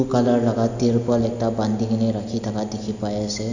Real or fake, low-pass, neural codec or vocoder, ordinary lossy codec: real; 7.2 kHz; none; none